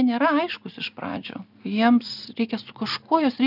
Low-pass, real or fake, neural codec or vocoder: 5.4 kHz; real; none